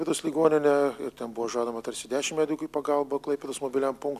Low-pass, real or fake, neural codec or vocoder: 14.4 kHz; real; none